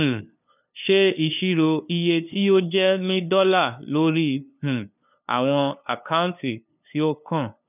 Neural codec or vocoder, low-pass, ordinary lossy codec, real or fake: codec, 16 kHz, 2 kbps, FunCodec, trained on LibriTTS, 25 frames a second; 3.6 kHz; none; fake